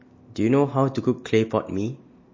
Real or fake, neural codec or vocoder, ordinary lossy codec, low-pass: real; none; MP3, 32 kbps; 7.2 kHz